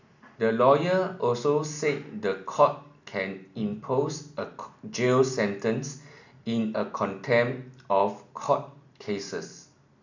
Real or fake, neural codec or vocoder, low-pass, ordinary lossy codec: real; none; 7.2 kHz; none